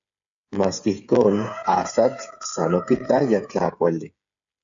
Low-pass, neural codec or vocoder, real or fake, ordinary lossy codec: 7.2 kHz; codec, 16 kHz, 8 kbps, FreqCodec, smaller model; fake; AAC, 64 kbps